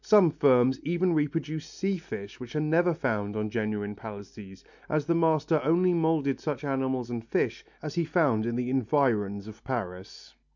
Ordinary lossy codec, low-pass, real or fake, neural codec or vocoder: MP3, 64 kbps; 7.2 kHz; real; none